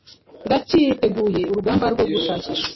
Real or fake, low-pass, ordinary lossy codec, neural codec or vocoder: real; 7.2 kHz; MP3, 24 kbps; none